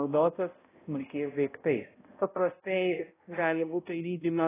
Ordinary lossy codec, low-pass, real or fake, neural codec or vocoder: AAC, 16 kbps; 3.6 kHz; fake; codec, 16 kHz, 0.5 kbps, X-Codec, HuBERT features, trained on balanced general audio